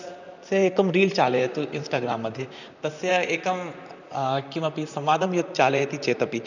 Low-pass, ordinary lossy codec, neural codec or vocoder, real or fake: 7.2 kHz; none; vocoder, 44.1 kHz, 128 mel bands, Pupu-Vocoder; fake